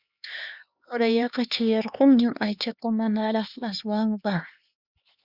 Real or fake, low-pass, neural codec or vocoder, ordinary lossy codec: fake; 5.4 kHz; codec, 16 kHz, 4 kbps, X-Codec, HuBERT features, trained on LibriSpeech; Opus, 64 kbps